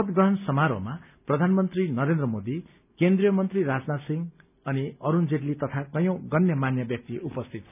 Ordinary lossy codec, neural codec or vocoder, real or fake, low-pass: none; none; real; 3.6 kHz